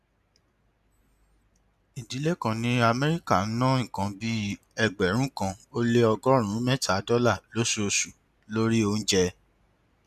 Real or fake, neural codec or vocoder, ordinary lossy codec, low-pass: real; none; none; 14.4 kHz